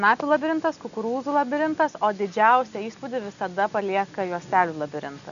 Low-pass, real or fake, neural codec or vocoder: 7.2 kHz; real; none